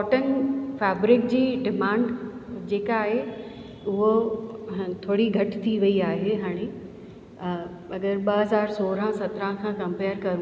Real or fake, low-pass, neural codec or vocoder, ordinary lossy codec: real; none; none; none